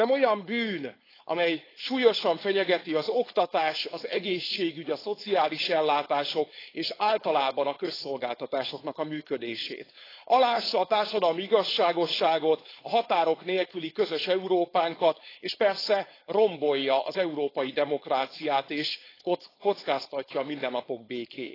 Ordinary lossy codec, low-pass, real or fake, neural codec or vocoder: AAC, 24 kbps; 5.4 kHz; fake; codec, 16 kHz, 4.8 kbps, FACodec